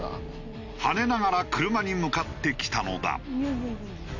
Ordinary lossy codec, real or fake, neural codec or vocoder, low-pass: none; real; none; 7.2 kHz